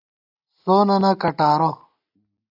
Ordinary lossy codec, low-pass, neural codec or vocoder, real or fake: AAC, 48 kbps; 5.4 kHz; none; real